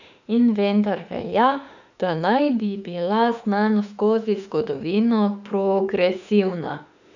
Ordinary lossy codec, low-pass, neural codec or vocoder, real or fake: none; 7.2 kHz; autoencoder, 48 kHz, 32 numbers a frame, DAC-VAE, trained on Japanese speech; fake